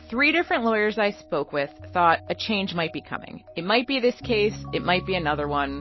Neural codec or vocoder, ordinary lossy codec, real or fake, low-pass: none; MP3, 24 kbps; real; 7.2 kHz